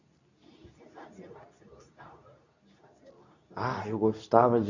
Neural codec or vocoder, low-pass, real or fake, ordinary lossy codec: codec, 24 kHz, 0.9 kbps, WavTokenizer, medium speech release version 2; 7.2 kHz; fake; none